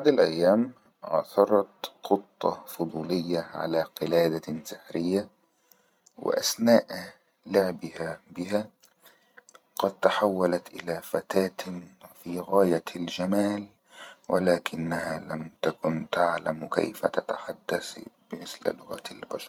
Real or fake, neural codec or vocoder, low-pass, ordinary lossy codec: fake; vocoder, 44.1 kHz, 128 mel bands every 512 samples, BigVGAN v2; 19.8 kHz; MP3, 96 kbps